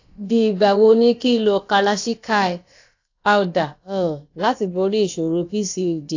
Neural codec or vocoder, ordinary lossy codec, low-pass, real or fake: codec, 16 kHz, about 1 kbps, DyCAST, with the encoder's durations; AAC, 48 kbps; 7.2 kHz; fake